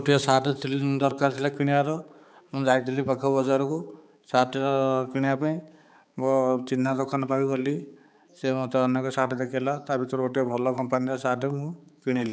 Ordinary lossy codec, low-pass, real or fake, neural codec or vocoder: none; none; fake; codec, 16 kHz, 4 kbps, X-Codec, HuBERT features, trained on balanced general audio